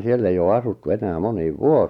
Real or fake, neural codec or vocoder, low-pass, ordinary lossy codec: real; none; 19.8 kHz; none